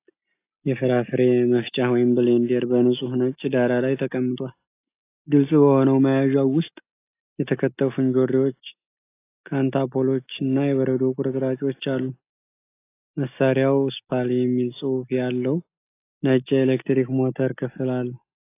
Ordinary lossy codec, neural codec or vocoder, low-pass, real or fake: AAC, 24 kbps; none; 3.6 kHz; real